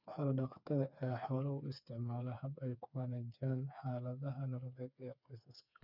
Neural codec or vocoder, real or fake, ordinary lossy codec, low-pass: codec, 16 kHz, 4 kbps, FreqCodec, smaller model; fake; none; 5.4 kHz